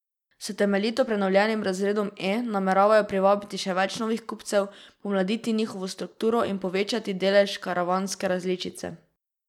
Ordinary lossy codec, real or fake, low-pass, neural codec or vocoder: none; real; 19.8 kHz; none